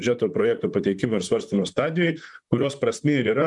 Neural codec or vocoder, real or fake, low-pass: vocoder, 44.1 kHz, 128 mel bands, Pupu-Vocoder; fake; 10.8 kHz